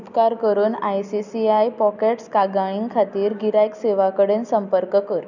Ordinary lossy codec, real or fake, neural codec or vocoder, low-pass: none; real; none; 7.2 kHz